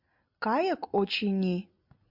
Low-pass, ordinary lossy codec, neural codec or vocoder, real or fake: 5.4 kHz; MP3, 48 kbps; none; real